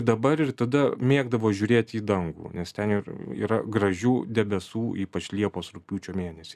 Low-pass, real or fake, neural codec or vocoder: 14.4 kHz; real; none